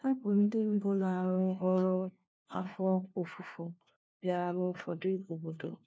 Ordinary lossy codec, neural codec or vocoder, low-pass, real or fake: none; codec, 16 kHz, 1 kbps, FunCodec, trained on LibriTTS, 50 frames a second; none; fake